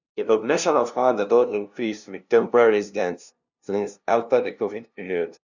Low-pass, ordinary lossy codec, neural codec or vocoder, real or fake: 7.2 kHz; none; codec, 16 kHz, 0.5 kbps, FunCodec, trained on LibriTTS, 25 frames a second; fake